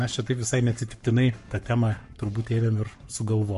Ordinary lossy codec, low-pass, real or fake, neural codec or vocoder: MP3, 48 kbps; 14.4 kHz; fake; codec, 44.1 kHz, 7.8 kbps, Pupu-Codec